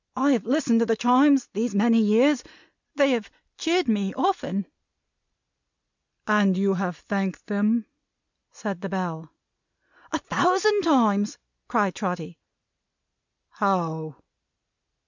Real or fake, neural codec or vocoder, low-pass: real; none; 7.2 kHz